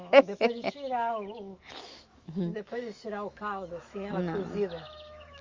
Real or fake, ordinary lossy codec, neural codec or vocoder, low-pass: fake; Opus, 32 kbps; autoencoder, 48 kHz, 128 numbers a frame, DAC-VAE, trained on Japanese speech; 7.2 kHz